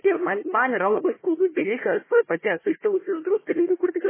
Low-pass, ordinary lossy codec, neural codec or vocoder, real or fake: 3.6 kHz; MP3, 16 kbps; codec, 16 kHz, 1 kbps, FunCodec, trained on Chinese and English, 50 frames a second; fake